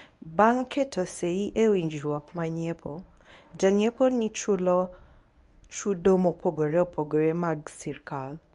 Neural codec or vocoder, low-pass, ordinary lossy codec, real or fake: codec, 24 kHz, 0.9 kbps, WavTokenizer, medium speech release version 1; 10.8 kHz; none; fake